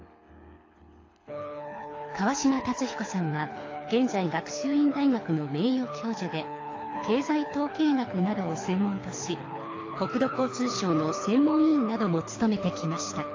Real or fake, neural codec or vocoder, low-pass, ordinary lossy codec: fake; codec, 24 kHz, 6 kbps, HILCodec; 7.2 kHz; AAC, 32 kbps